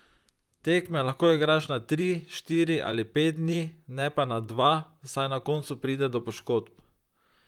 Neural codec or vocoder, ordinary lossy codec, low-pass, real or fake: vocoder, 44.1 kHz, 128 mel bands, Pupu-Vocoder; Opus, 32 kbps; 19.8 kHz; fake